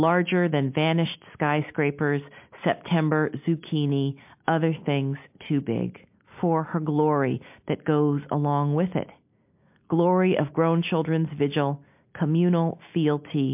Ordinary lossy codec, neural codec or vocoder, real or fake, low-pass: MP3, 32 kbps; none; real; 3.6 kHz